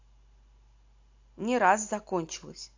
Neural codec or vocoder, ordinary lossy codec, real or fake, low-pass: none; AAC, 48 kbps; real; 7.2 kHz